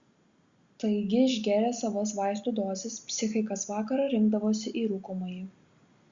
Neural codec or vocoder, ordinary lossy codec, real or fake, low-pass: none; Opus, 64 kbps; real; 7.2 kHz